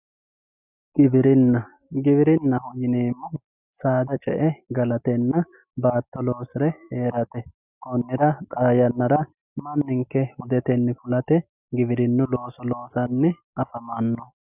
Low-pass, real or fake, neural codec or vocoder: 3.6 kHz; real; none